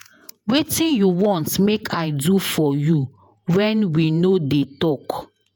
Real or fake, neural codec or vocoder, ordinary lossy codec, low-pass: fake; vocoder, 48 kHz, 128 mel bands, Vocos; none; none